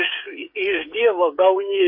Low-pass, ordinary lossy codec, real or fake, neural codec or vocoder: 5.4 kHz; MP3, 32 kbps; fake; codec, 16 kHz, 8 kbps, FreqCodec, smaller model